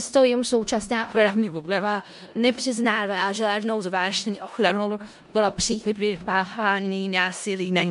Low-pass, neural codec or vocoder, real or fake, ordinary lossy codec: 10.8 kHz; codec, 16 kHz in and 24 kHz out, 0.4 kbps, LongCat-Audio-Codec, four codebook decoder; fake; MP3, 64 kbps